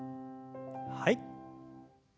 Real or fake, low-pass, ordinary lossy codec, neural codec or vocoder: real; none; none; none